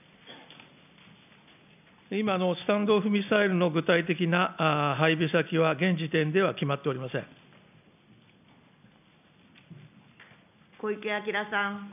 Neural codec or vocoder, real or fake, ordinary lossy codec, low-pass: none; real; none; 3.6 kHz